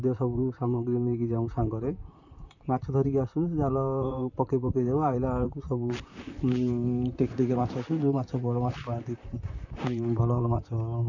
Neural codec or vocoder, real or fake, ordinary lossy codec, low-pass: vocoder, 44.1 kHz, 128 mel bands, Pupu-Vocoder; fake; none; 7.2 kHz